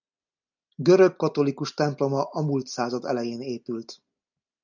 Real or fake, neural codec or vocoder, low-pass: real; none; 7.2 kHz